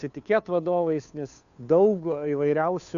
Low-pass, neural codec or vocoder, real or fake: 7.2 kHz; codec, 16 kHz, 2 kbps, FunCodec, trained on Chinese and English, 25 frames a second; fake